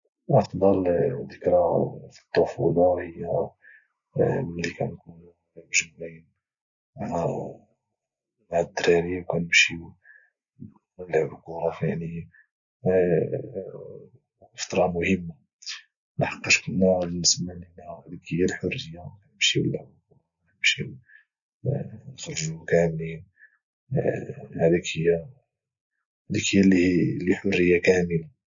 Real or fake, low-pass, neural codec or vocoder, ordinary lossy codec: real; 7.2 kHz; none; none